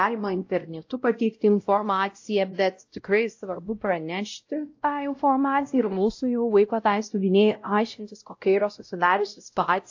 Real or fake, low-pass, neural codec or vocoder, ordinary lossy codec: fake; 7.2 kHz; codec, 16 kHz, 0.5 kbps, X-Codec, WavLM features, trained on Multilingual LibriSpeech; AAC, 48 kbps